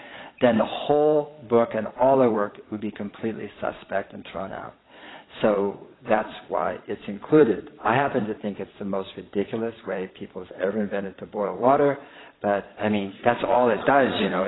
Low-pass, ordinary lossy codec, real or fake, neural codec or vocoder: 7.2 kHz; AAC, 16 kbps; fake; vocoder, 22.05 kHz, 80 mel bands, Vocos